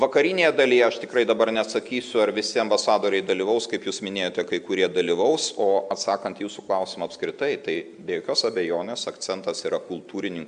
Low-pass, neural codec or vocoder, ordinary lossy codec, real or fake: 9.9 kHz; none; MP3, 96 kbps; real